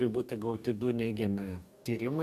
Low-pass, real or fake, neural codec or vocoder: 14.4 kHz; fake; codec, 44.1 kHz, 2.6 kbps, DAC